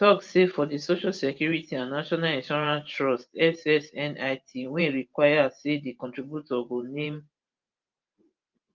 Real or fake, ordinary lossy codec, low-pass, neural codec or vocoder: fake; Opus, 24 kbps; 7.2 kHz; vocoder, 44.1 kHz, 128 mel bands every 512 samples, BigVGAN v2